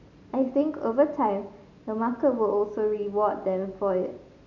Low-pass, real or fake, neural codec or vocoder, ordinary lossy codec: 7.2 kHz; real; none; none